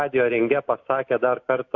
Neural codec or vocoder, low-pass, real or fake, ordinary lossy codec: none; 7.2 kHz; real; AAC, 48 kbps